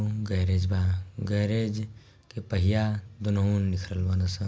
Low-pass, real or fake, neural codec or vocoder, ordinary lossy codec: none; real; none; none